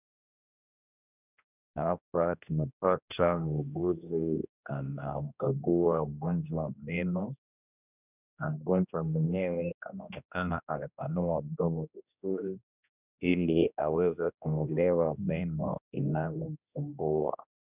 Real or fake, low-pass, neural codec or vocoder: fake; 3.6 kHz; codec, 16 kHz, 1 kbps, X-Codec, HuBERT features, trained on general audio